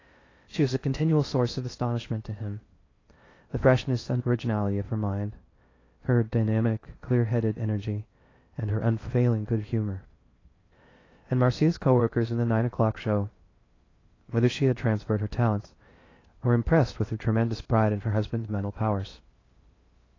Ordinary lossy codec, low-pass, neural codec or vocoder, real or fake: AAC, 32 kbps; 7.2 kHz; codec, 16 kHz in and 24 kHz out, 0.6 kbps, FocalCodec, streaming, 2048 codes; fake